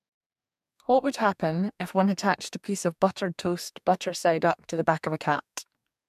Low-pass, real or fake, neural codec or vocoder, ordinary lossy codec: 14.4 kHz; fake; codec, 44.1 kHz, 2.6 kbps, DAC; MP3, 96 kbps